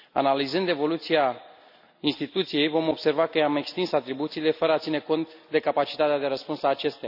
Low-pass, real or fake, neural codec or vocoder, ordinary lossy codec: 5.4 kHz; real; none; none